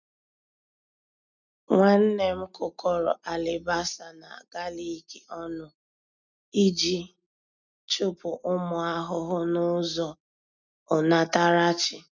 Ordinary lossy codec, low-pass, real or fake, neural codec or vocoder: none; 7.2 kHz; real; none